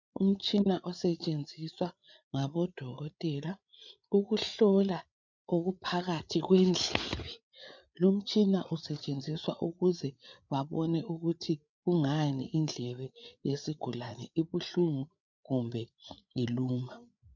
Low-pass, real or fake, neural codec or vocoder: 7.2 kHz; fake; codec, 16 kHz, 8 kbps, FreqCodec, larger model